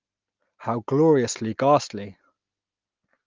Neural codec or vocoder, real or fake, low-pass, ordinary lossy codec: none; real; 7.2 kHz; Opus, 32 kbps